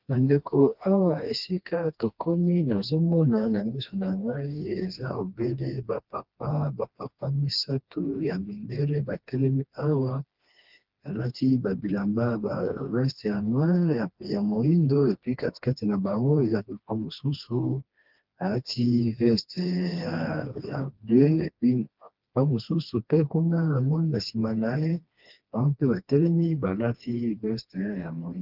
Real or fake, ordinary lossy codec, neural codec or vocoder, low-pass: fake; Opus, 24 kbps; codec, 16 kHz, 2 kbps, FreqCodec, smaller model; 5.4 kHz